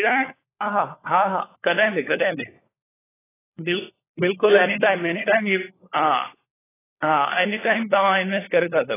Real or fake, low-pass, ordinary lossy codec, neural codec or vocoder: fake; 3.6 kHz; AAC, 16 kbps; codec, 16 kHz, 4 kbps, FunCodec, trained on LibriTTS, 50 frames a second